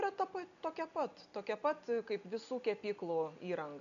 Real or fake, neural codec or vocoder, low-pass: real; none; 7.2 kHz